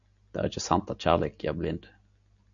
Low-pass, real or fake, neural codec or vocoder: 7.2 kHz; real; none